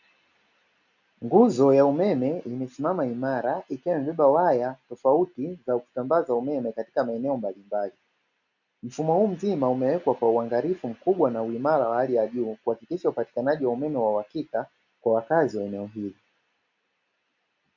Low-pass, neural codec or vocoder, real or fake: 7.2 kHz; none; real